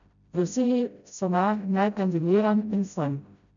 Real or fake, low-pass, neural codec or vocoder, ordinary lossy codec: fake; 7.2 kHz; codec, 16 kHz, 0.5 kbps, FreqCodec, smaller model; none